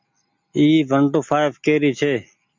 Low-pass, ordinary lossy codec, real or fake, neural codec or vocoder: 7.2 kHz; MP3, 64 kbps; real; none